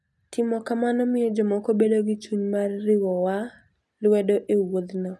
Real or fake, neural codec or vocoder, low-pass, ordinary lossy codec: real; none; none; none